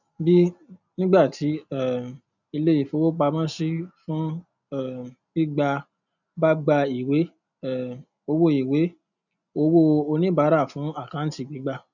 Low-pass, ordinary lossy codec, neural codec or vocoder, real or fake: 7.2 kHz; none; none; real